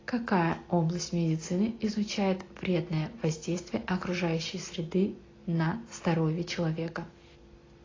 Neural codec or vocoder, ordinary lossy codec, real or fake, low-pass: none; AAC, 32 kbps; real; 7.2 kHz